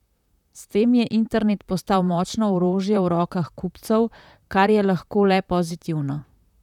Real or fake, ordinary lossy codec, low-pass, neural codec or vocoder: fake; none; 19.8 kHz; vocoder, 44.1 kHz, 128 mel bands, Pupu-Vocoder